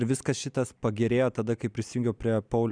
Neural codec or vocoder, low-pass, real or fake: none; 9.9 kHz; real